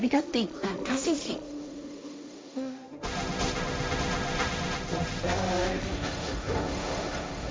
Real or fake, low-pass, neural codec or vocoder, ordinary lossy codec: fake; none; codec, 16 kHz, 1.1 kbps, Voila-Tokenizer; none